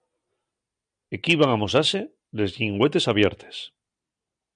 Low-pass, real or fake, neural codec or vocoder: 9.9 kHz; real; none